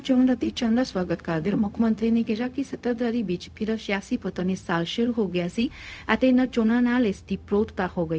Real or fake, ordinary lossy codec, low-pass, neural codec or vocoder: fake; none; none; codec, 16 kHz, 0.4 kbps, LongCat-Audio-Codec